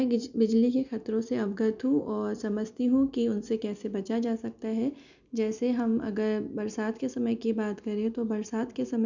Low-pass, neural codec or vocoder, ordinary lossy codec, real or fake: 7.2 kHz; none; none; real